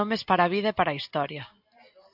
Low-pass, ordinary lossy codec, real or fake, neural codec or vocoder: 5.4 kHz; AAC, 48 kbps; real; none